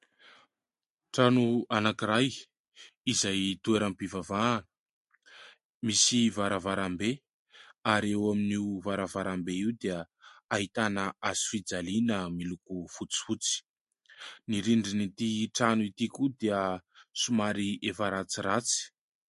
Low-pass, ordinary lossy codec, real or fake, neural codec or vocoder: 14.4 kHz; MP3, 48 kbps; real; none